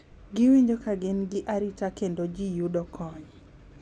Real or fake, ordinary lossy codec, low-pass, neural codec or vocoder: real; none; none; none